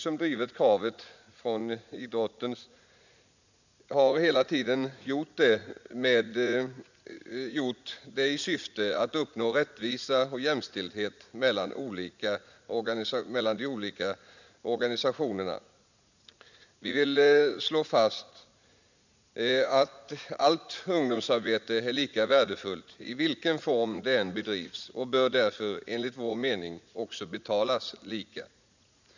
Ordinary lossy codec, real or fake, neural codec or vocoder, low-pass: none; fake; vocoder, 44.1 kHz, 80 mel bands, Vocos; 7.2 kHz